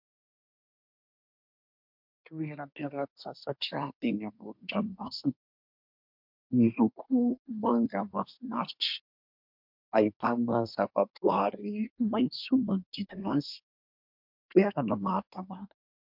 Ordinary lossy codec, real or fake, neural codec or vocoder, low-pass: MP3, 48 kbps; fake; codec, 24 kHz, 1 kbps, SNAC; 5.4 kHz